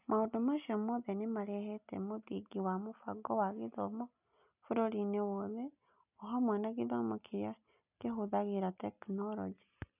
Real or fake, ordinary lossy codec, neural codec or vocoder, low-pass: real; none; none; 3.6 kHz